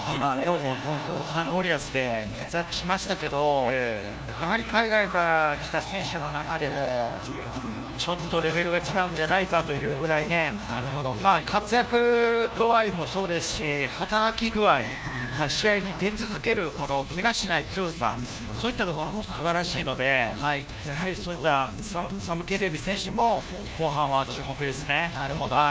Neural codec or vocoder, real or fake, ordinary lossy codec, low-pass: codec, 16 kHz, 1 kbps, FunCodec, trained on LibriTTS, 50 frames a second; fake; none; none